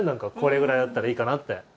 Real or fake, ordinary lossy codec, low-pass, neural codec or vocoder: real; none; none; none